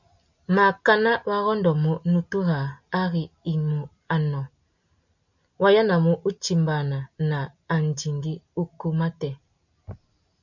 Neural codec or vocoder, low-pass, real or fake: none; 7.2 kHz; real